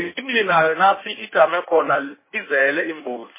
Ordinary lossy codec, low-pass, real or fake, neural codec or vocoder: MP3, 16 kbps; 3.6 kHz; fake; codec, 16 kHz in and 24 kHz out, 1.1 kbps, FireRedTTS-2 codec